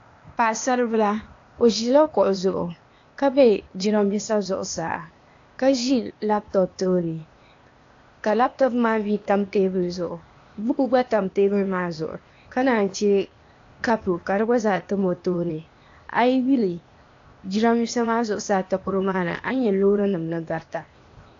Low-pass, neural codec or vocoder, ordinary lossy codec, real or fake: 7.2 kHz; codec, 16 kHz, 0.8 kbps, ZipCodec; AAC, 48 kbps; fake